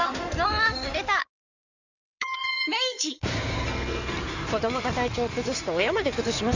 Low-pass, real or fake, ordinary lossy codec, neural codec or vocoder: 7.2 kHz; fake; none; codec, 16 kHz in and 24 kHz out, 2.2 kbps, FireRedTTS-2 codec